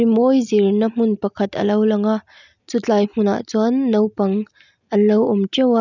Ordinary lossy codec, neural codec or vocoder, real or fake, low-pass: none; none; real; 7.2 kHz